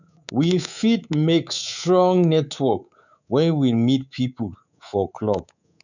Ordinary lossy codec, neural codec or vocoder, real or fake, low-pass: none; codec, 24 kHz, 3.1 kbps, DualCodec; fake; 7.2 kHz